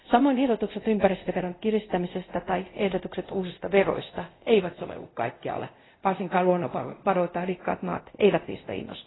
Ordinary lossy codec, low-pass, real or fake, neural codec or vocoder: AAC, 16 kbps; 7.2 kHz; fake; codec, 24 kHz, 0.5 kbps, DualCodec